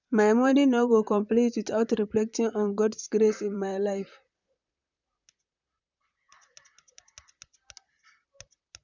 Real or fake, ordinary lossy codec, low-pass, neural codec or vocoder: fake; none; 7.2 kHz; vocoder, 44.1 kHz, 128 mel bands, Pupu-Vocoder